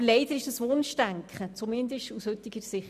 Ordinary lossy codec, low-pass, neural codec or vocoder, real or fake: none; 14.4 kHz; none; real